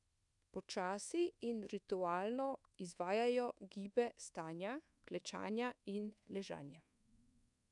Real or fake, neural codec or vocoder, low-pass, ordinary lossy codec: fake; codec, 24 kHz, 1.2 kbps, DualCodec; 10.8 kHz; none